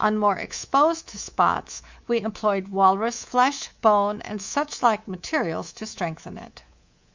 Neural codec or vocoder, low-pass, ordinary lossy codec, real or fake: codec, 24 kHz, 3.1 kbps, DualCodec; 7.2 kHz; Opus, 64 kbps; fake